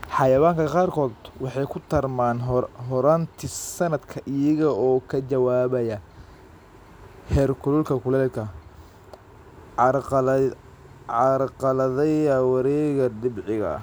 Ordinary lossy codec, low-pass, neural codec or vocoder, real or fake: none; none; none; real